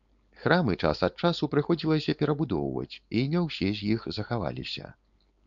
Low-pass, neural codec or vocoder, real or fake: 7.2 kHz; codec, 16 kHz, 4.8 kbps, FACodec; fake